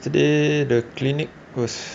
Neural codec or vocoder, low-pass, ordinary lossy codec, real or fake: none; none; none; real